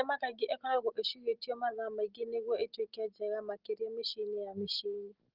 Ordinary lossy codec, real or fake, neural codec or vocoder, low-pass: Opus, 16 kbps; real; none; 5.4 kHz